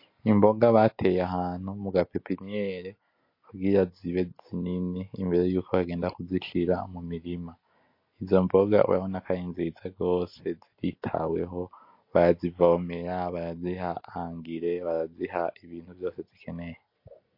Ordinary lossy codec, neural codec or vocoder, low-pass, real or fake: MP3, 32 kbps; none; 5.4 kHz; real